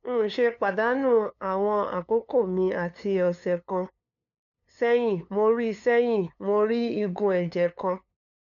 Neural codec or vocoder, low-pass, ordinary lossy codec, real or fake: codec, 16 kHz, 2 kbps, FunCodec, trained on LibriTTS, 25 frames a second; 7.2 kHz; none; fake